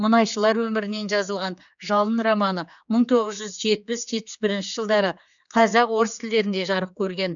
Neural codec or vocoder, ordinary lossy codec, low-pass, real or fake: codec, 16 kHz, 4 kbps, X-Codec, HuBERT features, trained on general audio; none; 7.2 kHz; fake